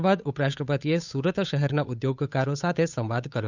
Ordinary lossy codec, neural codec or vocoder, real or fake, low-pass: none; codec, 16 kHz, 8 kbps, FunCodec, trained on Chinese and English, 25 frames a second; fake; 7.2 kHz